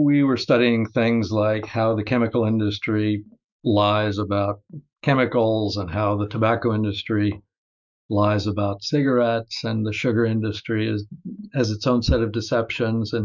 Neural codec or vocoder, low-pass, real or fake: autoencoder, 48 kHz, 128 numbers a frame, DAC-VAE, trained on Japanese speech; 7.2 kHz; fake